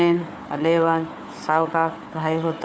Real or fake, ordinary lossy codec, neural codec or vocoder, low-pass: fake; none; codec, 16 kHz, 4 kbps, FunCodec, trained on Chinese and English, 50 frames a second; none